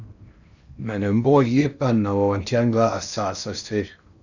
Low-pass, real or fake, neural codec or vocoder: 7.2 kHz; fake; codec, 16 kHz in and 24 kHz out, 0.6 kbps, FocalCodec, streaming, 4096 codes